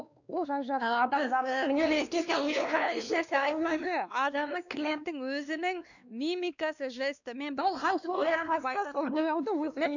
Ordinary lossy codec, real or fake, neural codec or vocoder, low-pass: none; fake; codec, 16 kHz, 2 kbps, X-Codec, WavLM features, trained on Multilingual LibriSpeech; 7.2 kHz